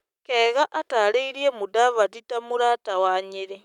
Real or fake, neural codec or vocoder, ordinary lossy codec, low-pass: fake; codec, 44.1 kHz, 7.8 kbps, Pupu-Codec; none; 19.8 kHz